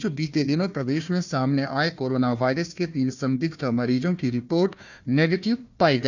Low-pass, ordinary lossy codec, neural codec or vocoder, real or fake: 7.2 kHz; none; codec, 16 kHz, 1 kbps, FunCodec, trained on Chinese and English, 50 frames a second; fake